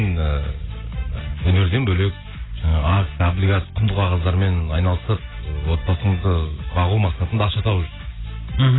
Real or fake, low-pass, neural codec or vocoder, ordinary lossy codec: real; 7.2 kHz; none; AAC, 16 kbps